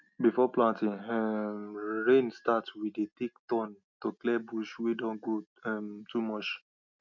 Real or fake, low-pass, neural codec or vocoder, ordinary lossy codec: real; 7.2 kHz; none; none